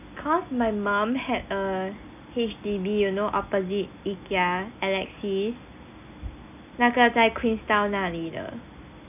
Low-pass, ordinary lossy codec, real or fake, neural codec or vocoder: 3.6 kHz; none; real; none